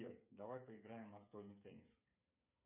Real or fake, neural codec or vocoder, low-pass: fake; codec, 16 kHz, 8 kbps, FreqCodec, smaller model; 3.6 kHz